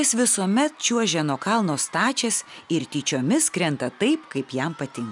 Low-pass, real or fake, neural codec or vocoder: 10.8 kHz; real; none